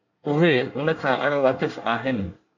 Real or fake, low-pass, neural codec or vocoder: fake; 7.2 kHz; codec, 24 kHz, 1 kbps, SNAC